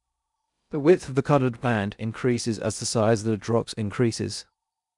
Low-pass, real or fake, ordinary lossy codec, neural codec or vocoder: 10.8 kHz; fake; none; codec, 16 kHz in and 24 kHz out, 0.6 kbps, FocalCodec, streaming, 2048 codes